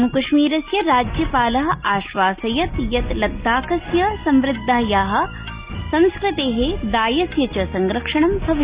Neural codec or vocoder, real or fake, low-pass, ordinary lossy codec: none; real; 3.6 kHz; Opus, 64 kbps